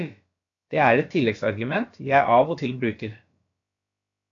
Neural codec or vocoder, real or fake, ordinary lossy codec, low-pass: codec, 16 kHz, about 1 kbps, DyCAST, with the encoder's durations; fake; AAC, 48 kbps; 7.2 kHz